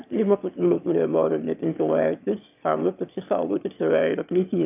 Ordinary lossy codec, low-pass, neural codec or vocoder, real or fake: AAC, 32 kbps; 3.6 kHz; autoencoder, 22.05 kHz, a latent of 192 numbers a frame, VITS, trained on one speaker; fake